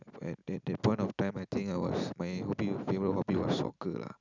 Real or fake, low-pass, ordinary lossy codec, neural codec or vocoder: real; 7.2 kHz; none; none